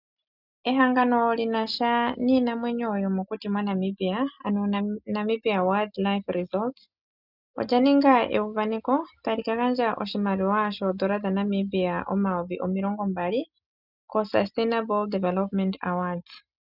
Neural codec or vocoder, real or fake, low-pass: none; real; 5.4 kHz